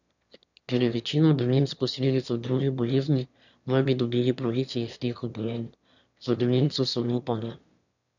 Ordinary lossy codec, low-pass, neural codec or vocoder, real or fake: none; 7.2 kHz; autoencoder, 22.05 kHz, a latent of 192 numbers a frame, VITS, trained on one speaker; fake